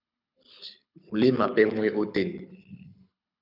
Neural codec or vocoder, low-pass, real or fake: codec, 24 kHz, 6 kbps, HILCodec; 5.4 kHz; fake